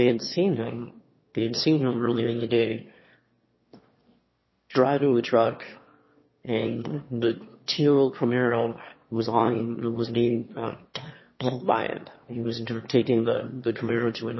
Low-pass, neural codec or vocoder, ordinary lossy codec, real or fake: 7.2 kHz; autoencoder, 22.05 kHz, a latent of 192 numbers a frame, VITS, trained on one speaker; MP3, 24 kbps; fake